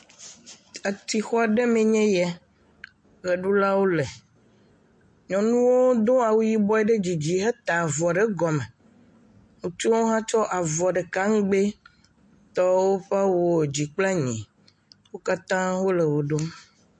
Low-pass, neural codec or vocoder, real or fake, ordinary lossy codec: 10.8 kHz; none; real; MP3, 48 kbps